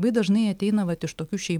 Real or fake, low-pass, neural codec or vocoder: real; 19.8 kHz; none